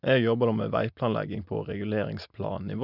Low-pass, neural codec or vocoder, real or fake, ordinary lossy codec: 5.4 kHz; none; real; none